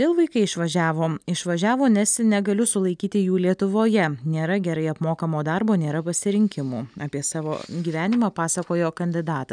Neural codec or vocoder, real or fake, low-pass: none; real; 9.9 kHz